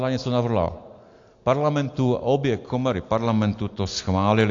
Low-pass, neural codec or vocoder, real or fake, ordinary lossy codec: 7.2 kHz; none; real; AAC, 48 kbps